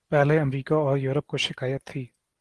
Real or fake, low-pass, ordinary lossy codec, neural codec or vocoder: real; 10.8 kHz; Opus, 16 kbps; none